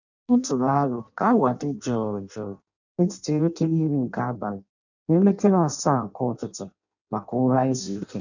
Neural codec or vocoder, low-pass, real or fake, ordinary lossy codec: codec, 16 kHz in and 24 kHz out, 0.6 kbps, FireRedTTS-2 codec; 7.2 kHz; fake; none